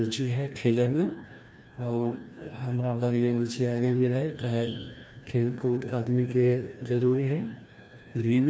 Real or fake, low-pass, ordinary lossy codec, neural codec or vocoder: fake; none; none; codec, 16 kHz, 1 kbps, FreqCodec, larger model